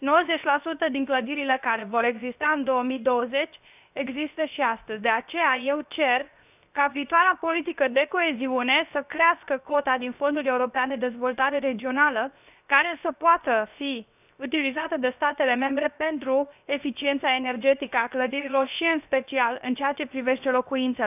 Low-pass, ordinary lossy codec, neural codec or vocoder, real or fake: 3.6 kHz; none; codec, 16 kHz, 0.7 kbps, FocalCodec; fake